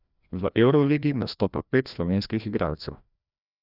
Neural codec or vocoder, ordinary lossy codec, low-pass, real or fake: codec, 16 kHz, 1 kbps, FreqCodec, larger model; none; 5.4 kHz; fake